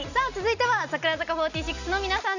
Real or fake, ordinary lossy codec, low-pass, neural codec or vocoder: real; none; 7.2 kHz; none